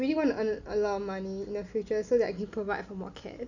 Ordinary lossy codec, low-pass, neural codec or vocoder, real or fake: none; 7.2 kHz; none; real